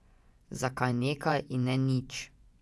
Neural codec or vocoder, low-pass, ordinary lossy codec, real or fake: vocoder, 24 kHz, 100 mel bands, Vocos; none; none; fake